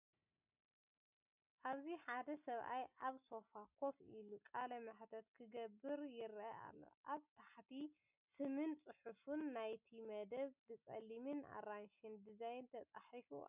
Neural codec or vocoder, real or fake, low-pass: none; real; 3.6 kHz